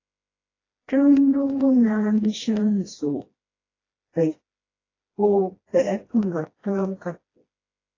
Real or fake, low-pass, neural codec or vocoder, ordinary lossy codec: fake; 7.2 kHz; codec, 16 kHz, 1 kbps, FreqCodec, smaller model; AAC, 32 kbps